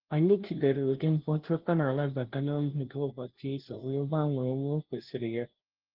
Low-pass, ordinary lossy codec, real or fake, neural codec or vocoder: 5.4 kHz; Opus, 16 kbps; fake; codec, 16 kHz, 0.5 kbps, FunCodec, trained on LibriTTS, 25 frames a second